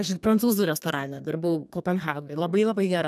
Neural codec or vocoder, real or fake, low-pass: codec, 32 kHz, 1.9 kbps, SNAC; fake; 14.4 kHz